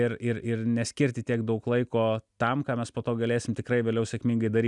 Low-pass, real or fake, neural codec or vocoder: 10.8 kHz; real; none